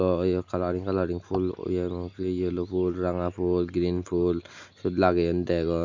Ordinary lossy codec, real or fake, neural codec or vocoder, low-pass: MP3, 64 kbps; real; none; 7.2 kHz